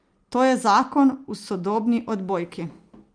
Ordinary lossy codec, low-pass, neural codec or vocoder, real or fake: Opus, 32 kbps; 9.9 kHz; none; real